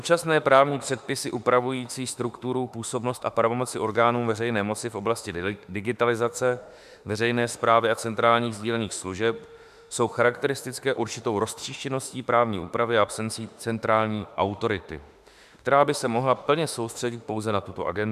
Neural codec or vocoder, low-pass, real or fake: autoencoder, 48 kHz, 32 numbers a frame, DAC-VAE, trained on Japanese speech; 14.4 kHz; fake